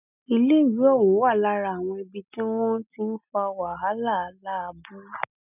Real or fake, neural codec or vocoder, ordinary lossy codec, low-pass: real; none; none; 3.6 kHz